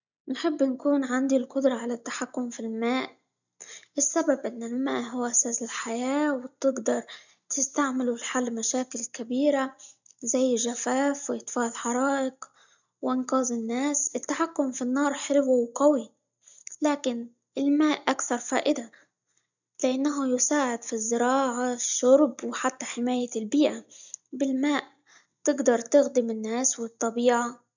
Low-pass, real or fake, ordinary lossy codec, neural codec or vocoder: 7.2 kHz; real; none; none